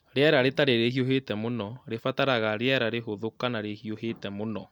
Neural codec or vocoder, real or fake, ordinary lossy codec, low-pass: none; real; MP3, 96 kbps; 19.8 kHz